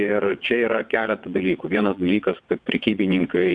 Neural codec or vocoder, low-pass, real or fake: vocoder, 22.05 kHz, 80 mel bands, WaveNeXt; 9.9 kHz; fake